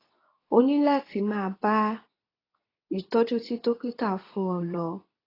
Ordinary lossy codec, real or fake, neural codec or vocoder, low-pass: AAC, 24 kbps; fake; codec, 24 kHz, 0.9 kbps, WavTokenizer, medium speech release version 2; 5.4 kHz